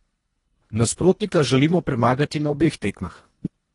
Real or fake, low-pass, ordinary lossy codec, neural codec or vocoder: fake; 10.8 kHz; AAC, 32 kbps; codec, 24 kHz, 1.5 kbps, HILCodec